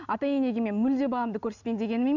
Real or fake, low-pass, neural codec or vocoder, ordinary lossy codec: real; 7.2 kHz; none; none